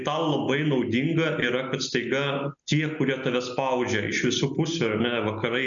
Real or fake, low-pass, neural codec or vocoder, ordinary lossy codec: real; 7.2 kHz; none; MP3, 96 kbps